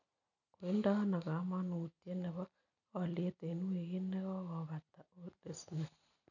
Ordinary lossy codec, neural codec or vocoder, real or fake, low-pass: none; none; real; 7.2 kHz